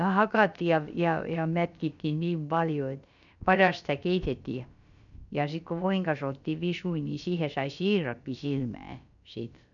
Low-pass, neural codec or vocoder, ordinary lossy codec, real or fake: 7.2 kHz; codec, 16 kHz, about 1 kbps, DyCAST, with the encoder's durations; none; fake